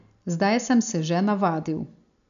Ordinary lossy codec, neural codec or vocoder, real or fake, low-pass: none; none; real; 7.2 kHz